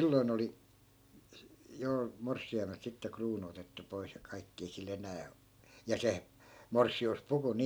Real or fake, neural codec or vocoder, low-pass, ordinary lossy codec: real; none; none; none